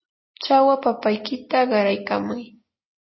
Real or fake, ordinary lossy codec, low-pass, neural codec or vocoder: real; MP3, 24 kbps; 7.2 kHz; none